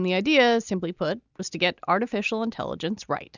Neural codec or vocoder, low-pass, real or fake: none; 7.2 kHz; real